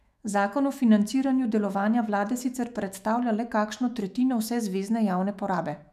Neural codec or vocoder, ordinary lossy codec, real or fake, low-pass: autoencoder, 48 kHz, 128 numbers a frame, DAC-VAE, trained on Japanese speech; none; fake; 14.4 kHz